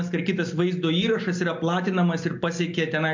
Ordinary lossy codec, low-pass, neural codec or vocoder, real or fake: MP3, 48 kbps; 7.2 kHz; none; real